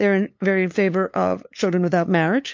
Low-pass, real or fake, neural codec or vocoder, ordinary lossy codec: 7.2 kHz; fake; codec, 16 kHz, 2 kbps, FunCodec, trained on LibriTTS, 25 frames a second; MP3, 48 kbps